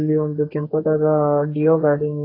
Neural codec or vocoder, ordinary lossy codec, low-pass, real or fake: codec, 32 kHz, 1.9 kbps, SNAC; AAC, 24 kbps; 5.4 kHz; fake